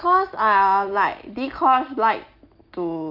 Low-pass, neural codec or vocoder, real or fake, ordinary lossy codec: 5.4 kHz; none; real; Opus, 24 kbps